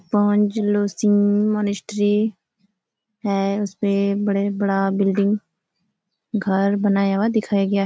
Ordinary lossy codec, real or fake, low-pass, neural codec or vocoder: none; real; none; none